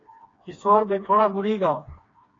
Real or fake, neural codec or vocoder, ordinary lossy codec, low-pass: fake; codec, 16 kHz, 2 kbps, FreqCodec, smaller model; AAC, 32 kbps; 7.2 kHz